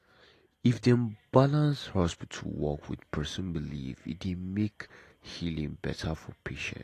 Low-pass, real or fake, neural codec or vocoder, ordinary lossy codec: 14.4 kHz; real; none; AAC, 48 kbps